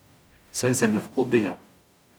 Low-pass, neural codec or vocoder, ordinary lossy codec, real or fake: none; codec, 44.1 kHz, 0.9 kbps, DAC; none; fake